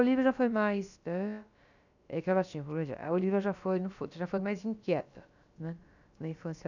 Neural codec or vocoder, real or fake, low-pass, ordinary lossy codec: codec, 16 kHz, about 1 kbps, DyCAST, with the encoder's durations; fake; 7.2 kHz; none